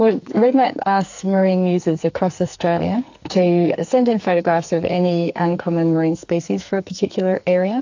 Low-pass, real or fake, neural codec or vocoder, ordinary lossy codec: 7.2 kHz; fake; codec, 44.1 kHz, 2.6 kbps, SNAC; AAC, 48 kbps